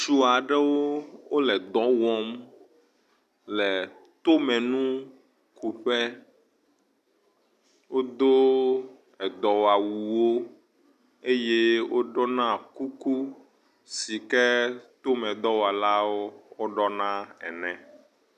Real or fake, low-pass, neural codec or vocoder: real; 14.4 kHz; none